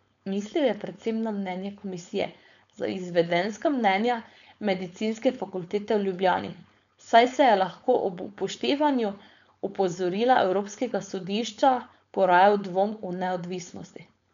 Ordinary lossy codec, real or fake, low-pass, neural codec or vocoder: none; fake; 7.2 kHz; codec, 16 kHz, 4.8 kbps, FACodec